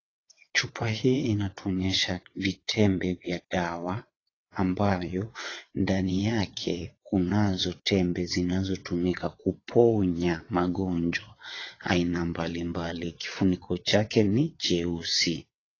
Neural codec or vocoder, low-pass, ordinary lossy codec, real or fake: vocoder, 22.05 kHz, 80 mel bands, WaveNeXt; 7.2 kHz; AAC, 32 kbps; fake